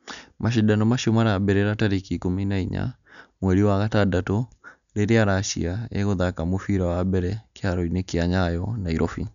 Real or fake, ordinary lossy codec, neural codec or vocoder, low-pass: real; none; none; 7.2 kHz